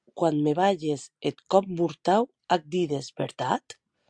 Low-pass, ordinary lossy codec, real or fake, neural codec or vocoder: 9.9 kHz; Opus, 64 kbps; real; none